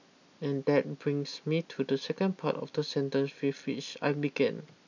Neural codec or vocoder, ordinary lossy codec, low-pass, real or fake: none; none; 7.2 kHz; real